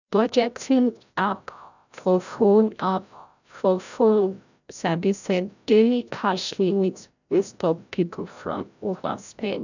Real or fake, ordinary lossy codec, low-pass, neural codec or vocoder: fake; none; 7.2 kHz; codec, 16 kHz, 0.5 kbps, FreqCodec, larger model